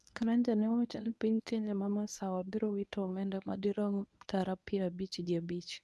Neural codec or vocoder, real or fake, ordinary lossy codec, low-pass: codec, 24 kHz, 0.9 kbps, WavTokenizer, medium speech release version 1; fake; none; none